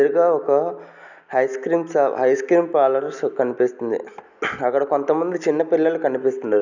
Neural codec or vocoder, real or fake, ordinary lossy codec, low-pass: none; real; none; 7.2 kHz